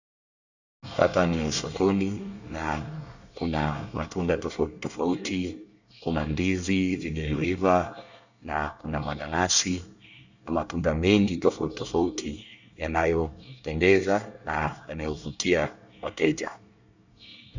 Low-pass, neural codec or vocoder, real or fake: 7.2 kHz; codec, 24 kHz, 1 kbps, SNAC; fake